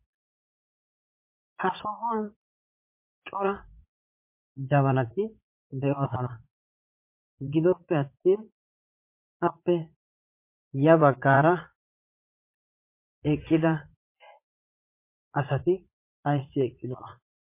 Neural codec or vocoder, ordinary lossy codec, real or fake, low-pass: vocoder, 44.1 kHz, 80 mel bands, Vocos; MP3, 32 kbps; fake; 3.6 kHz